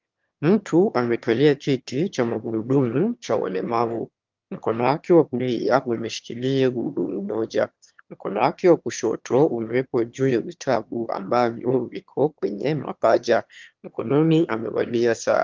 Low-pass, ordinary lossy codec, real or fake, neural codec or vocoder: 7.2 kHz; Opus, 32 kbps; fake; autoencoder, 22.05 kHz, a latent of 192 numbers a frame, VITS, trained on one speaker